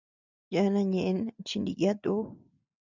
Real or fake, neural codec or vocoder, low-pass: real; none; 7.2 kHz